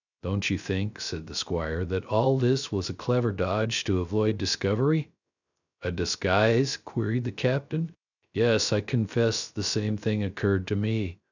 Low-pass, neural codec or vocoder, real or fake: 7.2 kHz; codec, 16 kHz, 0.3 kbps, FocalCodec; fake